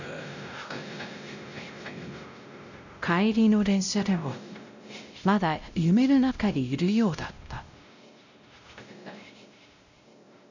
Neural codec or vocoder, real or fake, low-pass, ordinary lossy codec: codec, 16 kHz, 0.5 kbps, X-Codec, WavLM features, trained on Multilingual LibriSpeech; fake; 7.2 kHz; none